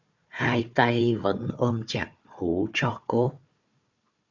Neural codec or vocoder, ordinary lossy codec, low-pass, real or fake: codec, 16 kHz, 4 kbps, FunCodec, trained on Chinese and English, 50 frames a second; Opus, 64 kbps; 7.2 kHz; fake